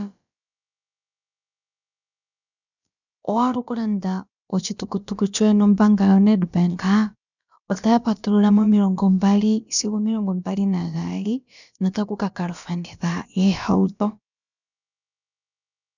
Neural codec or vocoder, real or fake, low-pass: codec, 16 kHz, about 1 kbps, DyCAST, with the encoder's durations; fake; 7.2 kHz